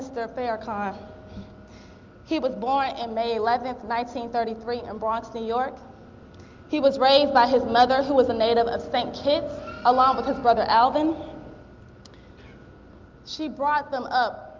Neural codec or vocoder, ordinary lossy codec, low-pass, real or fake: none; Opus, 32 kbps; 7.2 kHz; real